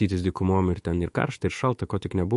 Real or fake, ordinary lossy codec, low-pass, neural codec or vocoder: fake; MP3, 48 kbps; 14.4 kHz; autoencoder, 48 kHz, 128 numbers a frame, DAC-VAE, trained on Japanese speech